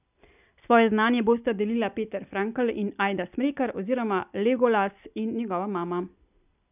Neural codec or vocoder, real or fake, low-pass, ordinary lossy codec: none; real; 3.6 kHz; none